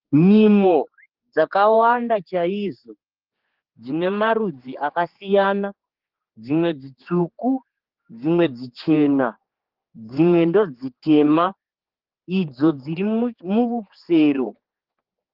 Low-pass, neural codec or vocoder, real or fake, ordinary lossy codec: 5.4 kHz; codec, 16 kHz, 4 kbps, X-Codec, HuBERT features, trained on general audio; fake; Opus, 16 kbps